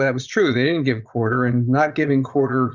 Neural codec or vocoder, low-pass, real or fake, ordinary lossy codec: vocoder, 22.05 kHz, 80 mel bands, Vocos; 7.2 kHz; fake; Opus, 64 kbps